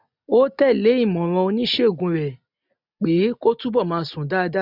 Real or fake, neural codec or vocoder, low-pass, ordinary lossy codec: real; none; 5.4 kHz; none